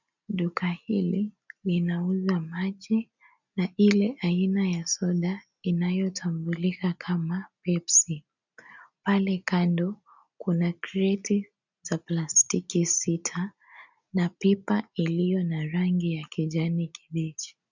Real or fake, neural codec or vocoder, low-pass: real; none; 7.2 kHz